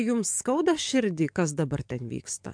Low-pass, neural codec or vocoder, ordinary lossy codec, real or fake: 9.9 kHz; codec, 44.1 kHz, 7.8 kbps, DAC; MP3, 64 kbps; fake